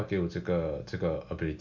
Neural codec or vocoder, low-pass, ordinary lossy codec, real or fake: none; 7.2 kHz; MP3, 64 kbps; real